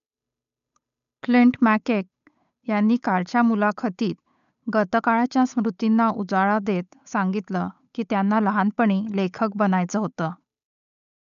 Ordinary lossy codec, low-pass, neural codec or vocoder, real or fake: none; 7.2 kHz; codec, 16 kHz, 8 kbps, FunCodec, trained on Chinese and English, 25 frames a second; fake